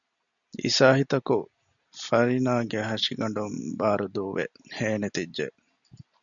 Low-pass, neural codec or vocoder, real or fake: 7.2 kHz; none; real